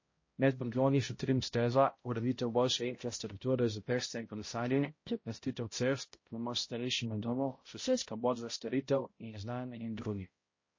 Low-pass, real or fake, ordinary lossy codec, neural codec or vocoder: 7.2 kHz; fake; MP3, 32 kbps; codec, 16 kHz, 0.5 kbps, X-Codec, HuBERT features, trained on balanced general audio